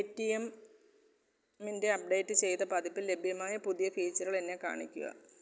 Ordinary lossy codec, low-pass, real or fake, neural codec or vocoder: none; none; real; none